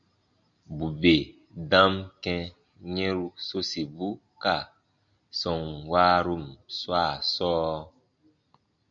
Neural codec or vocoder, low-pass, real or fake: none; 7.2 kHz; real